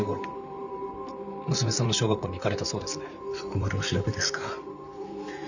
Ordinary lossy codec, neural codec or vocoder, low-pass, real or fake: none; vocoder, 44.1 kHz, 128 mel bands, Pupu-Vocoder; 7.2 kHz; fake